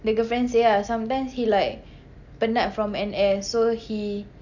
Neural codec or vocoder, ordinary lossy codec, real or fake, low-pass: none; none; real; 7.2 kHz